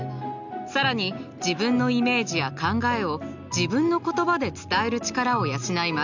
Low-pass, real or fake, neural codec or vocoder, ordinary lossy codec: 7.2 kHz; real; none; none